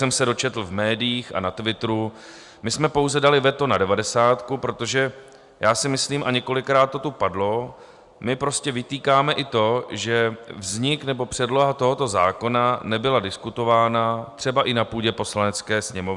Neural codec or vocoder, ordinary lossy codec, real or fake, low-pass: none; Opus, 64 kbps; real; 10.8 kHz